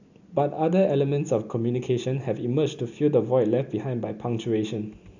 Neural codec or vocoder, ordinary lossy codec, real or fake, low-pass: none; none; real; 7.2 kHz